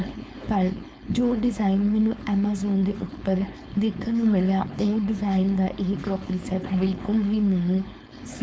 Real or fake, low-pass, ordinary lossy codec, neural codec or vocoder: fake; none; none; codec, 16 kHz, 4.8 kbps, FACodec